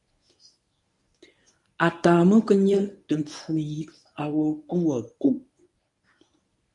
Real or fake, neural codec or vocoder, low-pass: fake; codec, 24 kHz, 0.9 kbps, WavTokenizer, medium speech release version 1; 10.8 kHz